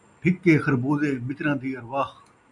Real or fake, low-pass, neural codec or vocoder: real; 10.8 kHz; none